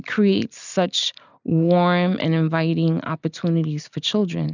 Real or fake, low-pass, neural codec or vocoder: real; 7.2 kHz; none